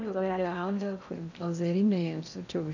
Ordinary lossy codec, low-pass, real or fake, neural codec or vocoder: none; 7.2 kHz; fake; codec, 16 kHz in and 24 kHz out, 0.6 kbps, FocalCodec, streaming, 2048 codes